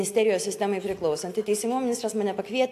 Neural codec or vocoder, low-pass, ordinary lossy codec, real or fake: none; 14.4 kHz; AAC, 64 kbps; real